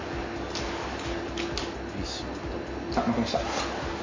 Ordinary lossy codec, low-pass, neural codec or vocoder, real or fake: MP3, 32 kbps; 7.2 kHz; none; real